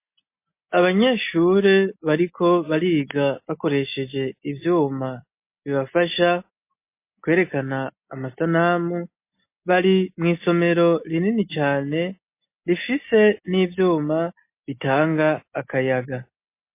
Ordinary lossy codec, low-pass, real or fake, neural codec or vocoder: MP3, 24 kbps; 3.6 kHz; real; none